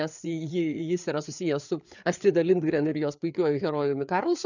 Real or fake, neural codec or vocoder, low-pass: fake; codec, 16 kHz, 4 kbps, FreqCodec, larger model; 7.2 kHz